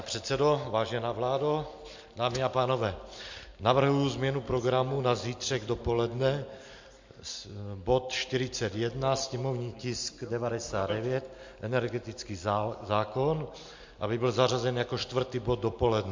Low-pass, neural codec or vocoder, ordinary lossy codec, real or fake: 7.2 kHz; none; MP3, 48 kbps; real